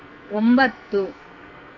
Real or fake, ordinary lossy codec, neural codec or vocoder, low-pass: fake; MP3, 64 kbps; codec, 44.1 kHz, 2.6 kbps, SNAC; 7.2 kHz